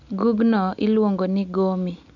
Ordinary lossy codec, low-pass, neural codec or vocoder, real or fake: none; 7.2 kHz; none; real